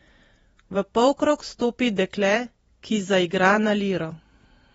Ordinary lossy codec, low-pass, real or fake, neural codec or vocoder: AAC, 24 kbps; 19.8 kHz; fake; vocoder, 48 kHz, 128 mel bands, Vocos